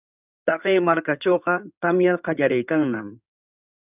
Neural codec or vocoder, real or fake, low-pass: codec, 16 kHz in and 24 kHz out, 2.2 kbps, FireRedTTS-2 codec; fake; 3.6 kHz